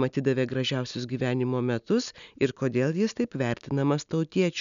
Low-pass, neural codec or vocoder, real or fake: 7.2 kHz; none; real